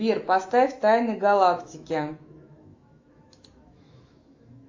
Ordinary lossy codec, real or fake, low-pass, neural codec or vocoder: AAC, 48 kbps; fake; 7.2 kHz; autoencoder, 48 kHz, 128 numbers a frame, DAC-VAE, trained on Japanese speech